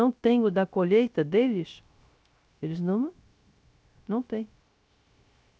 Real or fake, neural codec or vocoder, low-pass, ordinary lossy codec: fake; codec, 16 kHz, 0.3 kbps, FocalCodec; none; none